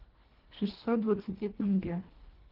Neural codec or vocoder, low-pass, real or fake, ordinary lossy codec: codec, 24 kHz, 1.5 kbps, HILCodec; 5.4 kHz; fake; Opus, 16 kbps